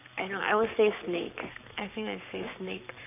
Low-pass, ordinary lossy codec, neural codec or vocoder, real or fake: 3.6 kHz; none; codec, 44.1 kHz, 7.8 kbps, Pupu-Codec; fake